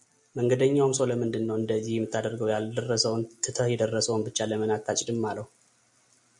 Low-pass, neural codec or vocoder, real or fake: 10.8 kHz; none; real